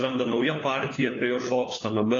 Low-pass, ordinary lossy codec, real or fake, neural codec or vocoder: 7.2 kHz; AAC, 32 kbps; fake; codec, 16 kHz, 4 kbps, FunCodec, trained on LibriTTS, 50 frames a second